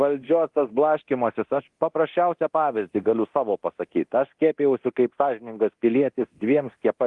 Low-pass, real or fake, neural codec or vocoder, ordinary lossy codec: 10.8 kHz; fake; codec, 24 kHz, 0.9 kbps, DualCodec; Opus, 24 kbps